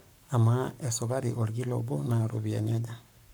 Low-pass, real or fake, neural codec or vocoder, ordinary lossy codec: none; fake; codec, 44.1 kHz, 7.8 kbps, Pupu-Codec; none